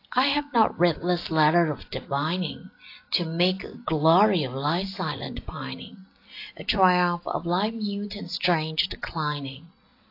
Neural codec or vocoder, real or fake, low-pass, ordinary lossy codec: none; real; 5.4 kHz; AAC, 32 kbps